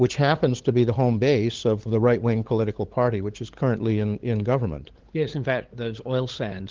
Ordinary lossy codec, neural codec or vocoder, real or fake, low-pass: Opus, 16 kbps; codec, 16 kHz, 8 kbps, FunCodec, trained on LibriTTS, 25 frames a second; fake; 7.2 kHz